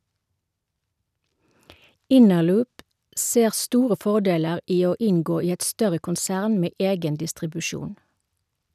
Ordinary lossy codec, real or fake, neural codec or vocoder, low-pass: none; real; none; 14.4 kHz